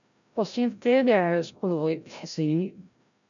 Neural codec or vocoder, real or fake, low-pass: codec, 16 kHz, 0.5 kbps, FreqCodec, larger model; fake; 7.2 kHz